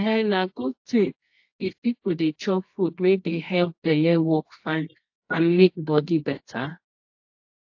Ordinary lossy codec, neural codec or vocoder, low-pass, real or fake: AAC, 48 kbps; codec, 24 kHz, 0.9 kbps, WavTokenizer, medium music audio release; 7.2 kHz; fake